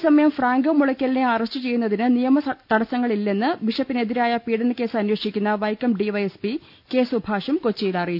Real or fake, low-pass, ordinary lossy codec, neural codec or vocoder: real; 5.4 kHz; none; none